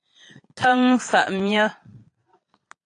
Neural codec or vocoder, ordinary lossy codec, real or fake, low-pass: vocoder, 22.05 kHz, 80 mel bands, Vocos; AAC, 48 kbps; fake; 9.9 kHz